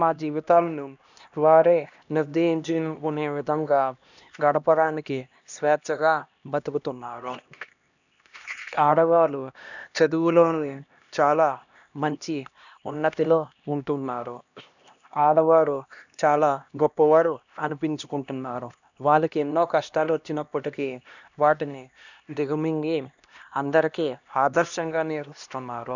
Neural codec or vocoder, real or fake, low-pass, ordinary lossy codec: codec, 16 kHz, 1 kbps, X-Codec, HuBERT features, trained on LibriSpeech; fake; 7.2 kHz; none